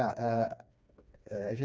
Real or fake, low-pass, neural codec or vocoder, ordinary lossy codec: fake; none; codec, 16 kHz, 2 kbps, FreqCodec, smaller model; none